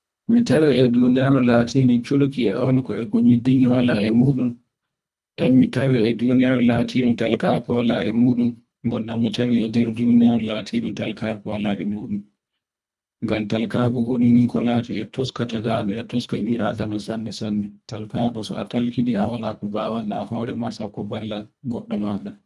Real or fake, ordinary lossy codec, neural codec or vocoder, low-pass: fake; none; codec, 24 kHz, 1.5 kbps, HILCodec; none